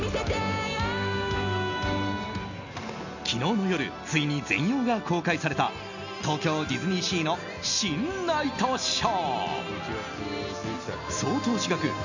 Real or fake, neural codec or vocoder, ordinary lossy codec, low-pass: real; none; none; 7.2 kHz